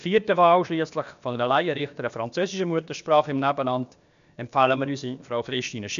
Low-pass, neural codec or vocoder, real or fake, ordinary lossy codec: 7.2 kHz; codec, 16 kHz, about 1 kbps, DyCAST, with the encoder's durations; fake; none